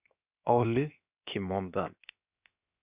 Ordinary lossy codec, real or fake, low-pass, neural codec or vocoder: Opus, 64 kbps; fake; 3.6 kHz; codec, 16 kHz, 0.7 kbps, FocalCodec